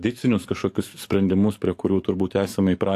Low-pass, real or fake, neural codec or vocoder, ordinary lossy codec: 14.4 kHz; fake; codec, 44.1 kHz, 7.8 kbps, DAC; AAC, 64 kbps